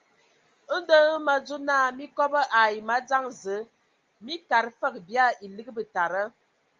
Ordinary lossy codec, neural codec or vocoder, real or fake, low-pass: Opus, 32 kbps; none; real; 7.2 kHz